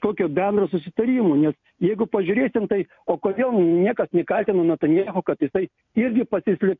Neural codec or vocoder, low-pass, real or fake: none; 7.2 kHz; real